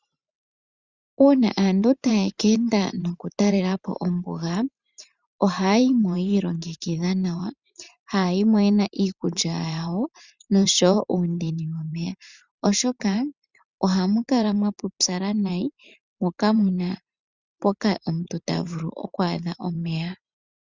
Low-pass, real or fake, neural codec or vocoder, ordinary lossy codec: 7.2 kHz; fake; vocoder, 44.1 kHz, 128 mel bands every 512 samples, BigVGAN v2; Opus, 64 kbps